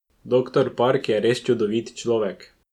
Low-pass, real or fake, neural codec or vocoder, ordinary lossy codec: 19.8 kHz; real; none; none